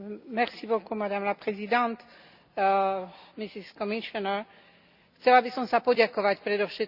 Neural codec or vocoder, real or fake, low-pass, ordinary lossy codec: none; real; 5.4 kHz; Opus, 64 kbps